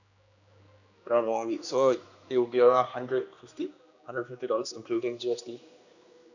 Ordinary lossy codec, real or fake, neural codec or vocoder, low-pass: none; fake; codec, 16 kHz, 2 kbps, X-Codec, HuBERT features, trained on general audio; 7.2 kHz